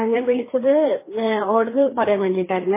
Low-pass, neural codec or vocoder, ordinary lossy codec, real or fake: 3.6 kHz; codec, 16 kHz, 2 kbps, FreqCodec, larger model; MP3, 16 kbps; fake